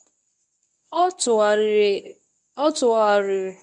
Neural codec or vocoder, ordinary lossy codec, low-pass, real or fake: codec, 24 kHz, 0.9 kbps, WavTokenizer, medium speech release version 1; none; none; fake